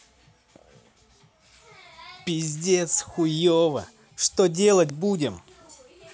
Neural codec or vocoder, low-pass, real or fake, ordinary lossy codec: none; none; real; none